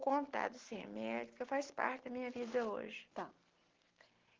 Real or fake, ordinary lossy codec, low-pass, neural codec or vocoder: real; Opus, 16 kbps; 7.2 kHz; none